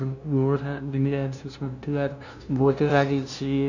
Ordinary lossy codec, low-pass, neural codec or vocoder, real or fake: none; 7.2 kHz; codec, 16 kHz, 0.5 kbps, FunCodec, trained on LibriTTS, 25 frames a second; fake